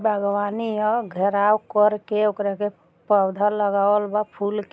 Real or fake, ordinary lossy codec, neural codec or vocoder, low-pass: real; none; none; none